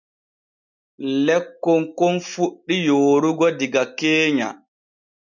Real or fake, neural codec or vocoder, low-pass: real; none; 7.2 kHz